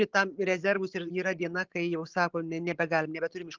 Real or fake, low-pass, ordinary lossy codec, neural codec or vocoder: real; 7.2 kHz; Opus, 24 kbps; none